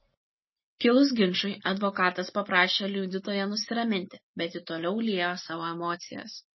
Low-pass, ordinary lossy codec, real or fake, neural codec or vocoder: 7.2 kHz; MP3, 24 kbps; real; none